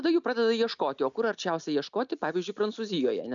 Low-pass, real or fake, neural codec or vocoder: 7.2 kHz; real; none